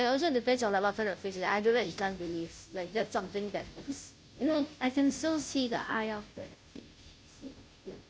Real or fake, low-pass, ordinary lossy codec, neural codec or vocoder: fake; none; none; codec, 16 kHz, 0.5 kbps, FunCodec, trained on Chinese and English, 25 frames a second